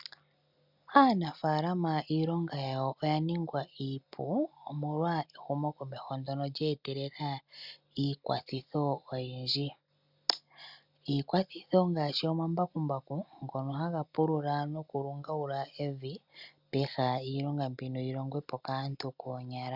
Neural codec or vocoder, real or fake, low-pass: none; real; 5.4 kHz